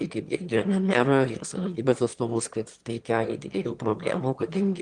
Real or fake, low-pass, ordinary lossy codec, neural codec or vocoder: fake; 9.9 kHz; Opus, 24 kbps; autoencoder, 22.05 kHz, a latent of 192 numbers a frame, VITS, trained on one speaker